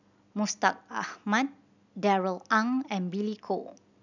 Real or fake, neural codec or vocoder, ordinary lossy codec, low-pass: real; none; none; 7.2 kHz